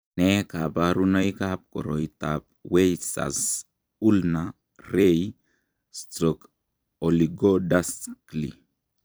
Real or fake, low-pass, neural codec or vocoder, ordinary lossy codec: fake; none; vocoder, 44.1 kHz, 128 mel bands every 256 samples, BigVGAN v2; none